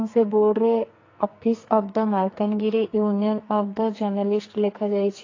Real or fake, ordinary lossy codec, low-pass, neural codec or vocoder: fake; AAC, 32 kbps; 7.2 kHz; codec, 44.1 kHz, 2.6 kbps, SNAC